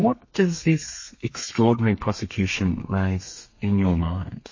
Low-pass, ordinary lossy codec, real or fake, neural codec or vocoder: 7.2 kHz; MP3, 32 kbps; fake; codec, 32 kHz, 1.9 kbps, SNAC